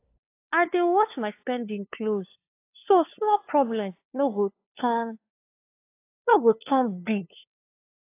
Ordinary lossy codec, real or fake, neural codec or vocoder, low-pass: AAC, 24 kbps; fake; codec, 16 kHz, 2 kbps, FunCodec, trained on LibriTTS, 25 frames a second; 3.6 kHz